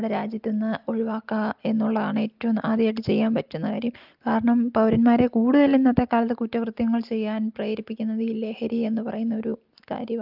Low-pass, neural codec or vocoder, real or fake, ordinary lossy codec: 5.4 kHz; none; real; Opus, 32 kbps